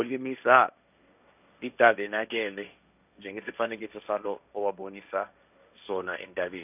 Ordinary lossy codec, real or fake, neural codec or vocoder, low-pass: none; fake; codec, 16 kHz, 1.1 kbps, Voila-Tokenizer; 3.6 kHz